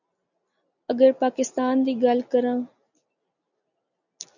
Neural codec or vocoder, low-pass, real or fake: none; 7.2 kHz; real